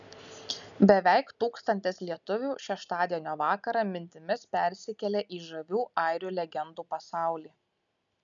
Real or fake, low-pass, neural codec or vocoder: real; 7.2 kHz; none